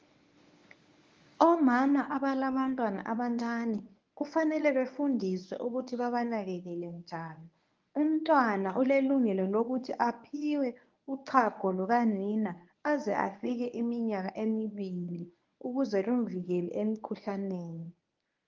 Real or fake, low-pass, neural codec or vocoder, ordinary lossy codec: fake; 7.2 kHz; codec, 24 kHz, 0.9 kbps, WavTokenizer, medium speech release version 1; Opus, 32 kbps